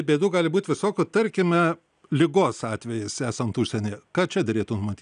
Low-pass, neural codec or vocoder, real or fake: 9.9 kHz; none; real